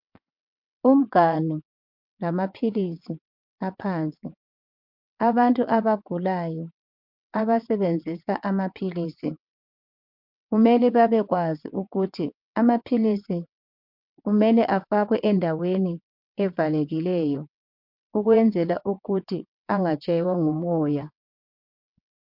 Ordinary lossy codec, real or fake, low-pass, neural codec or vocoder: MP3, 48 kbps; fake; 5.4 kHz; vocoder, 22.05 kHz, 80 mel bands, WaveNeXt